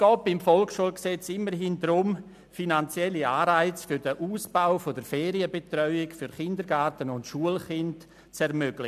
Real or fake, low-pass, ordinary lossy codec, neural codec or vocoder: real; 14.4 kHz; MP3, 96 kbps; none